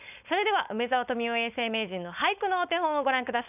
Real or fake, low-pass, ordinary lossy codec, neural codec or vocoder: fake; 3.6 kHz; none; codec, 16 kHz, 4.8 kbps, FACodec